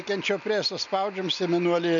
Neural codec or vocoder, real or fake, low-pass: none; real; 7.2 kHz